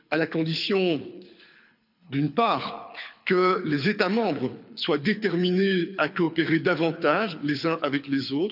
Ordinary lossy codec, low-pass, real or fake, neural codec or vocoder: none; 5.4 kHz; fake; codec, 24 kHz, 6 kbps, HILCodec